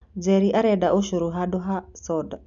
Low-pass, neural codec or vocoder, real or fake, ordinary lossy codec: 7.2 kHz; none; real; none